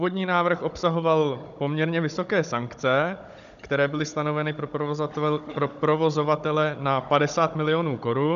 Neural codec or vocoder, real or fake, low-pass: codec, 16 kHz, 16 kbps, FunCodec, trained on Chinese and English, 50 frames a second; fake; 7.2 kHz